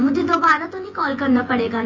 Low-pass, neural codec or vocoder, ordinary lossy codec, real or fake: 7.2 kHz; vocoder, 24 kHz, 100 mel bands, Vocos; MP3, 32 kbps; fake